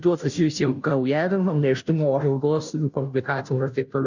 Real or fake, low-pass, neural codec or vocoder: fake; 7.2 kHz; codec, 16 kHz, 0.5 kbps, FunCodec, trained on Chinese and English, 25 frames a second